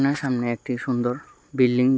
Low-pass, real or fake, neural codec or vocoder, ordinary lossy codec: none; real; none; none